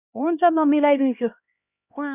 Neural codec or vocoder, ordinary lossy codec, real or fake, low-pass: codec, 16 kHz, 1 kbps, X-Codec, HuBERT features, trained on LibriSpeech; none; fake; 3.6 kHz